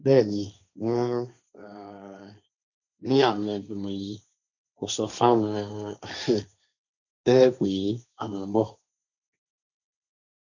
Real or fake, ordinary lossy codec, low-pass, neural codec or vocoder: fake; none; 7.2 kHz; codec, 16 kHz, 1.1 kbps, Voila-Tokenizer